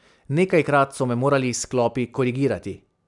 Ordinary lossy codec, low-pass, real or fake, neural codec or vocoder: none; 10.8 kHz; real; none